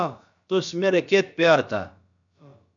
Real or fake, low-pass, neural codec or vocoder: fake; 7.2 kHz; codec, 16 kHz, about 1 kbps, DyCAST, with the encoder's durations